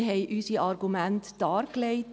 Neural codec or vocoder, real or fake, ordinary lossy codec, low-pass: none; real; none; none